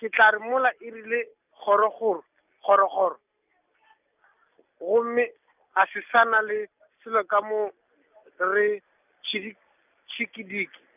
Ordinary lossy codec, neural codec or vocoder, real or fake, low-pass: none; none; real; 3.6 kHz